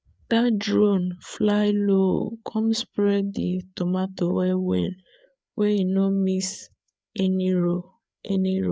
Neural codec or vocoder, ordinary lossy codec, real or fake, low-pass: codec, 16 kHz, 4 kbps, FreqCodec, larger model; none; fake; none